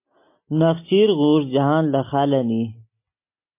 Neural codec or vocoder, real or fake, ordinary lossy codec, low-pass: none; real; MP3, 24 kbps; 3.6 kHz